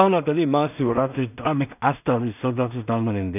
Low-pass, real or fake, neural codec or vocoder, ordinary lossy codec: 3.6 kHz; fake; codec, 16 kHz in and 24 kHz out, 0.4 kbps, LongCat-Audio-Codec, two codebook decoder; none